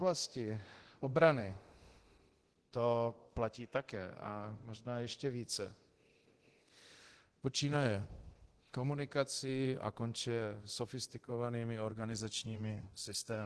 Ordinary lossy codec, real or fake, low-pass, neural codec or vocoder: Opus, 16 kbps; fake; 10.8 kHz; codec, 24 kHz, 0.9 kbps, DualCodec